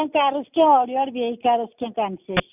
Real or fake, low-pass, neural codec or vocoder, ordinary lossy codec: real; 3.6 kHz; none; none